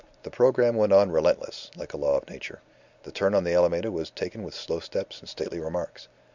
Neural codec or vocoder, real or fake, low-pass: none; real; 7.2 kHz